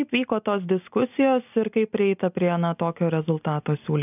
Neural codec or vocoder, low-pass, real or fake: none; 3.6 kHz; real